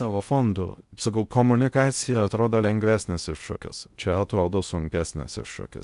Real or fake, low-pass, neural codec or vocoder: fake; 10.8 kHz; codec, 16 kHz in and 24 kHz out, 0.6 kbps, FocalCodec, streaming, 2048 codes